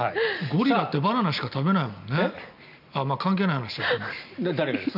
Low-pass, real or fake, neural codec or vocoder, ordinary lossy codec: 5.4 kHz; real; none; none